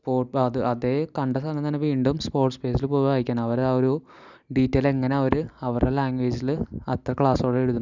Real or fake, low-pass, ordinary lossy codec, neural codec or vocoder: real; 7.2 kHz; none; none